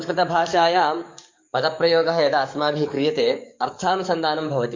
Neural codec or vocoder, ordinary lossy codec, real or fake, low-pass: codec, 44.1 kHz, 7.8 kbps, DAC; MP3, 48 kbps; fake; 7.2 kHz